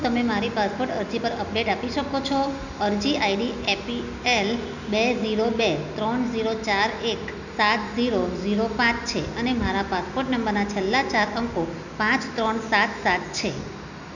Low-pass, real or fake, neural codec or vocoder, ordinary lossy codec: 7.2 kHz; real; none; none